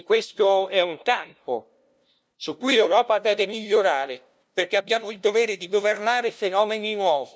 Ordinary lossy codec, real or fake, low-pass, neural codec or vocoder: none; fake; none; codec, 16 kHz, 0.5 kbps, FunCodec, trained on LibriTTS, 25 frames a second